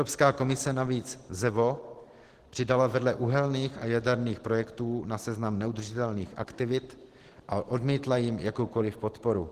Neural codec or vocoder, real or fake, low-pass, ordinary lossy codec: none; real; 14.4 kHz; Opus, 16 kbps